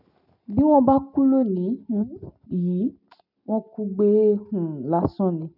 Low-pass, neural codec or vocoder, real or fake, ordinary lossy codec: 5.4 kHz; none; real; none